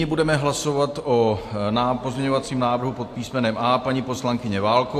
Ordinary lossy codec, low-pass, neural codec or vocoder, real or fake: AAC, 64 kbps; 14.4 kHz; none; real